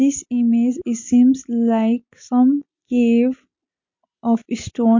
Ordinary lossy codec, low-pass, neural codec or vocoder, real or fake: MP3, 48 kbps; 7.2 kHz; none; real